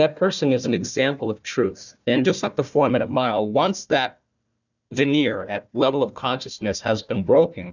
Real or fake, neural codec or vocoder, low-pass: fake; codec, 16 kHz, 1 kbps, FunCodec, trained on Chinese and English, 50 frames a second; 7.2 kHz